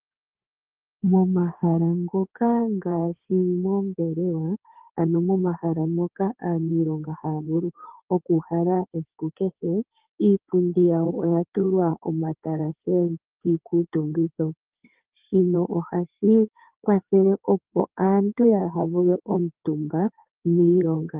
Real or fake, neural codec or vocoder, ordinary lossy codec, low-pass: fake; codec, 16 kHz in and 24 kHz out, 2.2 kbps, FireRedTTS-2 codec; Opus, 16 kbps; 3.6 kHz